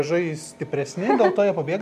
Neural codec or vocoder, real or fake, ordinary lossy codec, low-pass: none; real; MP3, 64 kbps; 14.4 kHz